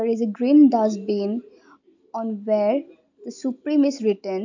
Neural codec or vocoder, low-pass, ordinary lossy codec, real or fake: none; 7.2 kHz; AAC, 48 kbps; real